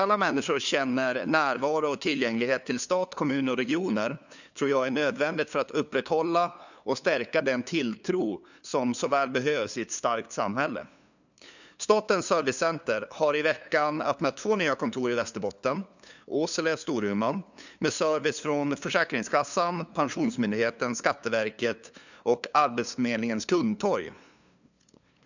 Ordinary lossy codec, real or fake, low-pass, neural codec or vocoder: none; fake; 7.2 kHz; codec, 16 kHz, 2 kbps, FunCodec, trained on LibriTTS, 25 frames a second